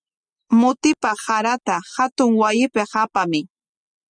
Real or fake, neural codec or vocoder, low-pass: real; none; 9.9 kHz